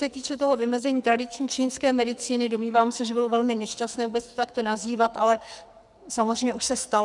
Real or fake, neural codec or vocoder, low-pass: fake; codec, 44.1 kHz, 2.6 kbps, SNAC; 10.8 kHz